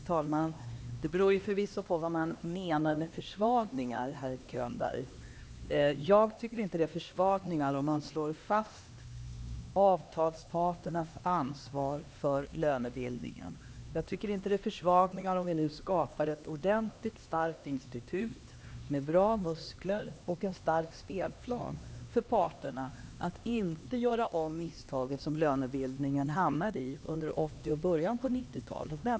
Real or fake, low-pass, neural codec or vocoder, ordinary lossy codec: fake; none; codec, 16 kHz, 2 kbps, X-Codec, HuBERT features, trained on LibriSpeech; none